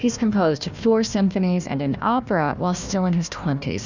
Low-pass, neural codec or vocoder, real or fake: 7.2 kHz; codec, 16 kHz, 1 kbps, FunCodec, trained on Chinese and English, 50 frames a second; fake